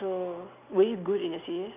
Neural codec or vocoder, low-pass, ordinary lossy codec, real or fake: none; 3.6 kHz; none; real